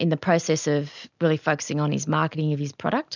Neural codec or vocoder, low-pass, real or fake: none; 7.2 kHz; real